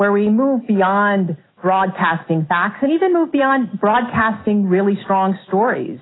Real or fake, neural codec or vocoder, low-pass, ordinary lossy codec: real; none; 7.2 kHz; AAC, 16 kbps